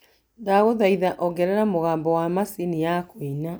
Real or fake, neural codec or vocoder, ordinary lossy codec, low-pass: real; none; none; none